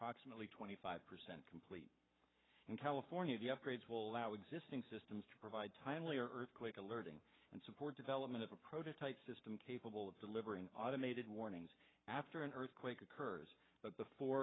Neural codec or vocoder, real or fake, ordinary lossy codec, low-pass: codec, 44.1 kHz, 7.8 kbps, Pupu-Codec; fake; AAC, 16 kbps; 7.2 kHz